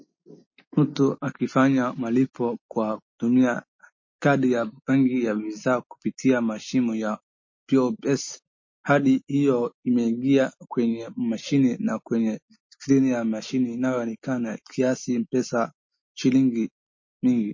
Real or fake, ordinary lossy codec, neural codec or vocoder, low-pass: real; MP3, 32 kbps; none; 7.2 kHz